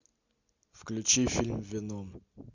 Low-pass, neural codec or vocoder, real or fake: 7.2 kHz; none; real